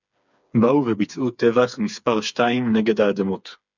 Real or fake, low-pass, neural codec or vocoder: fake; 7.2 kHz; codec, 16 kHz, 4 kbps, FreqCodec, smaller model